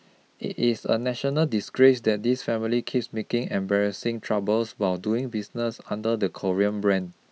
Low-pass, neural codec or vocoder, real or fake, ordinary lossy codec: none; none; real; none